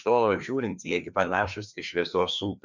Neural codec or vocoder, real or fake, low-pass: codec, 16 kHz, 2 kbps, FreqCodec, larger model; fake; 7.2 kHz